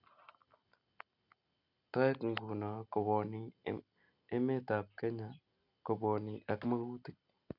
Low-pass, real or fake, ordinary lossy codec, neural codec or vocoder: 5.4 kHz; real; none; none